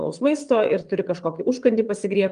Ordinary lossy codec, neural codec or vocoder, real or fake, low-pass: Opus, 24 kbps; vocoder, 22.05 kHz, 80 mel bands, WaveNeXt; fake; 9.9 kHz